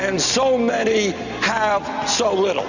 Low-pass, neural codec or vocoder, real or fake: 7.2 kHz; none; real